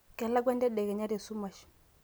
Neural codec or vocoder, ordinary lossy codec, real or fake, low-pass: none; none; real; none